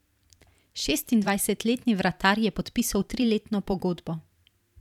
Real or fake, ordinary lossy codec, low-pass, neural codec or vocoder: fake; none; 19.8 kHz; vocoder, 44.1 kHz, 128 mel bands every 512 samples, BigVGAN v2